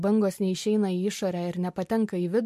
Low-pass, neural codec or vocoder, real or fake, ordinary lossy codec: 14.4 kHz; none; real; MP3, 64 kbps